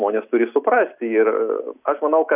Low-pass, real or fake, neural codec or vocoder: 3.6 kHz; real; none